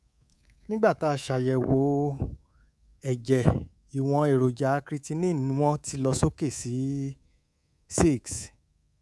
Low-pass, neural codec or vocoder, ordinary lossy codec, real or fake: none; codec, 24 kHz, 3.1 kbps, DualCodec; none; fake